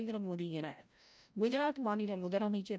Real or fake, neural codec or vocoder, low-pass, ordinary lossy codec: fake; codec, 16 kHz, 0.5 kbps, FreqCodec, larger model; none; none